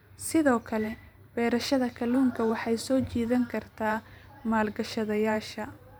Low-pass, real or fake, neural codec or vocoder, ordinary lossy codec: none; fake; vocoder, 44.1 kHz, 128 mel bands every 512 samples, BigVGAN v2; none